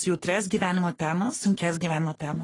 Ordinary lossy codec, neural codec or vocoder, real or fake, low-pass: AAC, 32 kbps; codec, 44.1 kHz, 3.4 kbps, Pupu-Codec; fake; 10.8 kHz